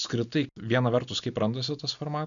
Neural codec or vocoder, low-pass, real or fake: none; 7.2 kHz; real